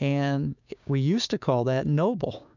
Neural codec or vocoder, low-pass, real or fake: codec, 16 kHz, 6 kbps, DAC; 7.2 kHz; fake